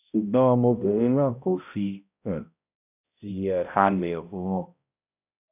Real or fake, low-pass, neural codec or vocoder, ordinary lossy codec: fake; 3.6 kHz; codec, 16 kHz, 0.5 kbps, X-Codec, HuBERT features, trained on balanced general audio; none